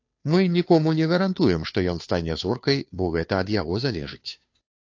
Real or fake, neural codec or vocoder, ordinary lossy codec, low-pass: fake; codec, 16 kHz, 2 kbps, FunCodec, trained on Chinese and English, 25 frames a second; AAC, 48 kbps; 7.2 kHz